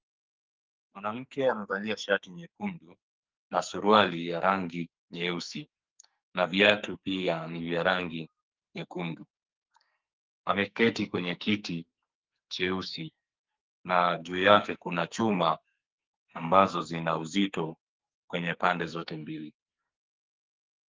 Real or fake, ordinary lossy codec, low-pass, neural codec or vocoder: fake; Opus, 24 kbps; 7.2 kHz; codec, 44.1 kHz, 2.6 kbps, SNAC